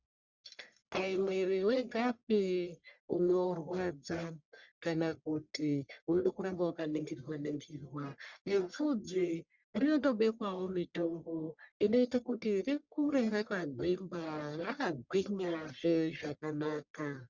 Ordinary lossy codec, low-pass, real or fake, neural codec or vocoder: Opus, 64 kbps; 7.2 kHz; fake; codec, 44.1 kHz, 1.7 kbps, Pupu-Codec